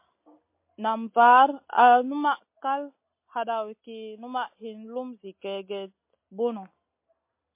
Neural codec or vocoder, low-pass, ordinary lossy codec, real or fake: none; 3.6 kHz; MP3, 24 kbps; real